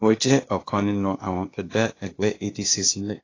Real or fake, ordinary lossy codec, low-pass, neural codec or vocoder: fake; AAC, 32 kbps; 7.2 kHz; codec, 16 kHz, 0.8 kbps, ZipCodec